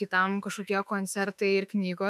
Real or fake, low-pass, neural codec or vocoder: fake; 14.4 kHz; autoencoder, 48 kHz, 32 numbers a frame, DAC-VAE, trained on Japanese speech